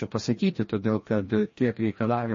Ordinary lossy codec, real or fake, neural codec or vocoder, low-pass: MP3, 32 kbps; fake; codec, 16 kHz, 1 kbps, FreqCodec, larger model; 7.2 kHz